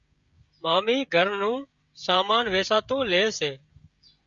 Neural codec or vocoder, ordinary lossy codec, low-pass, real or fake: codec, 16 kHz, 16 kbps, FreqCodec, smaller model; Opus, 64 kbps; 7.2 kHz; fake